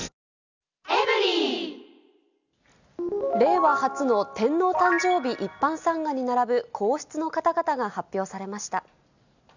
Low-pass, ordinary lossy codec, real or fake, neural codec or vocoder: 7.2 kHz; none; real; none